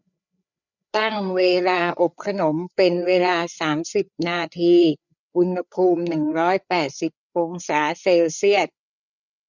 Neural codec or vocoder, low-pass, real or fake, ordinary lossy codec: codec, 16 kHz, 4 kbps, FreqCodec, larger model; 7.2 kHz; fake; none